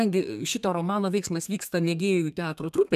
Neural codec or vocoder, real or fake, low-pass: codec, 32 kHz, 1.9 kbps, SNAC; fake; 14.4 kHz